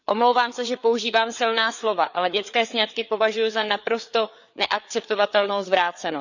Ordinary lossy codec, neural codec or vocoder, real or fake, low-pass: none; codec, 16 kHz, 4 kbps, FreqCodec, larger model; fake; 7.2 kHz